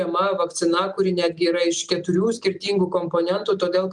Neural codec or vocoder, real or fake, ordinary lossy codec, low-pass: none; real; Opus, 32 kbps; 10.8 kHz